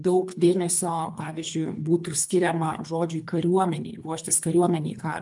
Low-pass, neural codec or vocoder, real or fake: 10.8 kHz; codec, 24 kHz, 3 kbps, HILCodec; fake